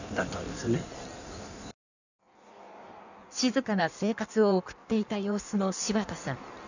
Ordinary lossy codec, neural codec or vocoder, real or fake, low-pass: none; codec, 16 kHz in and 24 kHz out, 1.1 kbps, FireRedTTS-2 codec; fake; 7.2 kHz